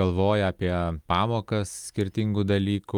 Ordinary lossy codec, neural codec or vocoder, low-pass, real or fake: Opus, 32 kbps; none; 19.8 kHz; real